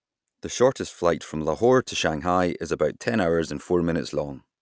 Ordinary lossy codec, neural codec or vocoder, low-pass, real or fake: none; none; none; real